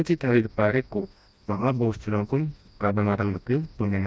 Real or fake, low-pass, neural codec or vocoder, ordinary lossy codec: fake; none; codec, 16 kHz, 1 kbps, FreqCodec, smaller model; none